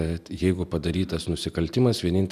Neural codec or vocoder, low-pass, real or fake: none; 14.4 kHz; real